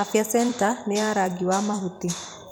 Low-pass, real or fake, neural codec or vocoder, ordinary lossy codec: none; fake; vocoder, 44.1 kHz, 128 mel bands every 256 samples, BigVGAN v2; none